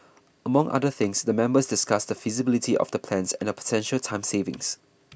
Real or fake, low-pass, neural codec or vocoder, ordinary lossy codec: real; none; none; none